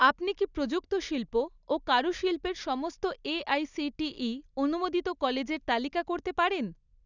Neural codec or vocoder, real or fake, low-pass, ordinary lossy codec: none; real; 7.2 kHz; none